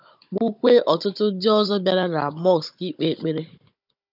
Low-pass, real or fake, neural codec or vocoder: 5.4 kHz; fake; codec, 16 kHz, 16 kbps, FunCodec, trained on Chinese and English, 50 frames a second